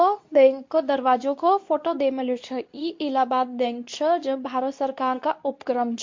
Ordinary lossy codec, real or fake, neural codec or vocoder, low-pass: MP3, 48 kbps; fake; codec, 24 kHz, 0.9 kbps, WavTokenizer, medium speech release version 2; 7.2 kHz